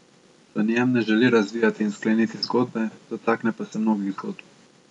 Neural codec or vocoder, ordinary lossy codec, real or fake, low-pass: none; none; real; 10.8 kHz